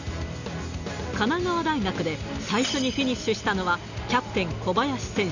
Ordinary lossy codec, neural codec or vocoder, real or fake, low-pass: none; none; real; 7.2 kHz